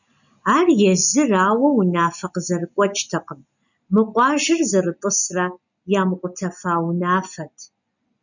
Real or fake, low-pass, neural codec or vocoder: real; 7.2 kHz; none